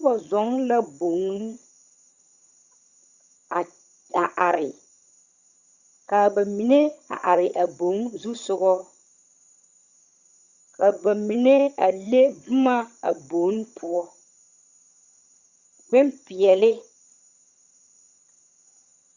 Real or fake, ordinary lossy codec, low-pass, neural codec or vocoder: fake; Opus, 64 kbps; 7.2 kHz; vocoder, 22.05 kHz, 80 mel bands, HiFi-GAN